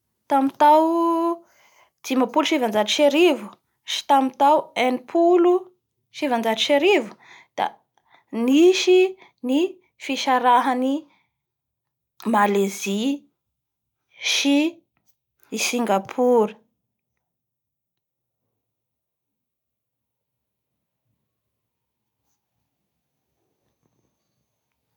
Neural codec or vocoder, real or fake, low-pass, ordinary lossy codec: none; real; 19.8 kHz; none